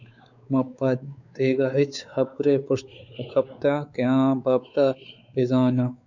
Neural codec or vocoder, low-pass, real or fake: codec, 16 kHz, 4 kbps, X-Codec, WavLM features, trained on Multilingual LibriSpeech; 7.2 kHz; fake